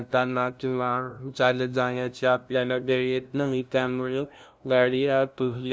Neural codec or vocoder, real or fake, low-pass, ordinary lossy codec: codec, 16 kHz, 0.5 kbps, FunCodec, trained on LibriTTS, 25 frames a second; fake; none; none